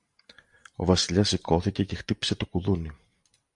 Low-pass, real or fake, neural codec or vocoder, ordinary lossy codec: 10.8 kHz; fake; vocoder, 44.1 kHz, 128 mel bands every 512 samples, BigVGAN v2; MP3, 64 kbps